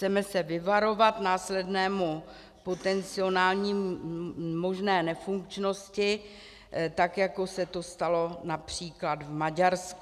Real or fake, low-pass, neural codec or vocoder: real; 14.4 kHz; none